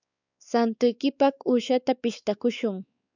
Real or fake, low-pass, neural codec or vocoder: fake; 7.2 kHz; codec, 16 kHz, 4 kbps, X-Codec, WavLM features, trained on Multilingual LibriSpeech